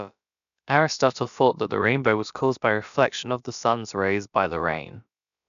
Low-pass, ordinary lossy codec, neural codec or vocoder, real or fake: 7.2 kHz; none; codec, 16 kHz, about 1 kbps, DyCAST, with the encoder's durations; fake